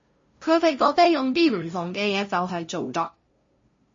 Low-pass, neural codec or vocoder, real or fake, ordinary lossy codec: 7.2 kHz; codec, 16 kHz, 0.5 kbps, FunCodec, trained on LibriTTS, 25 frames a second; fake; MP3, 32 kbps